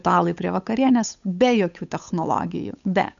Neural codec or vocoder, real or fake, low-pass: codec, 16 kHz, 8 kbps, FunCodec, trained on Chinese and English, 25 frames a second; fake; 7.2 kHz